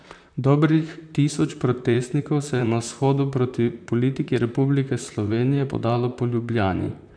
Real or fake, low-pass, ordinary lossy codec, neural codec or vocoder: fake; 9.9 kHz; none; vocoder, 44.1 kHz, 128 mel bands, Pupu-Vocoder